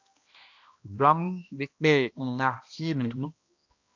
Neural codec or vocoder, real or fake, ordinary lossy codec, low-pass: codec, 16 kHz, 1 kbps, X-Codec, HuBERT features, trained on balanced general audio; fake; AAC, 48 kbps; 7.2 kHz